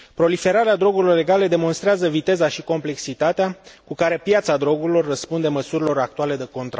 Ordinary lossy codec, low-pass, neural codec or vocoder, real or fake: none; none; none; real